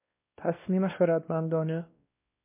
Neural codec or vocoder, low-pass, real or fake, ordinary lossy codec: codec, 16 kHz, 1 kbps, X-Codec, WavLM features, trained on Multilingual LibriSpeech; 3.6 kHz; fake; MP3, 24 kbps